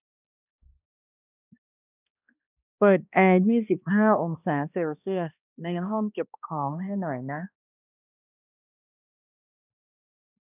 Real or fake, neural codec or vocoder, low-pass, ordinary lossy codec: fake; codec, 16 kHz, 1 kbps, X-Codec, HuBERT features, trained on balanced general audio; 3.6 kHz; none